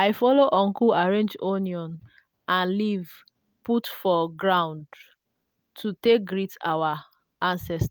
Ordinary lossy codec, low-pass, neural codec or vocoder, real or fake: none; none; none; real